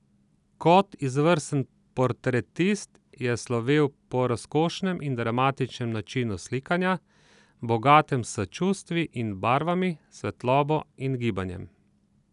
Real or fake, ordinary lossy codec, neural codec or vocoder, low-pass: real; none; none; 10.8 kHz